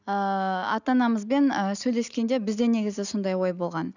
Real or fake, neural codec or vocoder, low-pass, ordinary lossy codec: real; none; 7.2 kHz; none